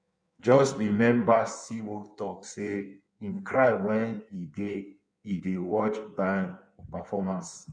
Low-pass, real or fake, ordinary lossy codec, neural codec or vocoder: 9.9 kHz; fake; none; codec, 16 kHz in and 24 kHz out, 1.1 kbps, FireRedTTS-2 codec